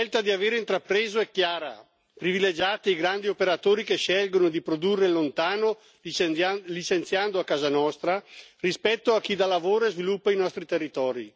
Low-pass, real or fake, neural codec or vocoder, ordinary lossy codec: none; real; none; none